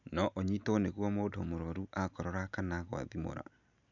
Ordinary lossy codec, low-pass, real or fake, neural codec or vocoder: none; 7.2 kHz; real; none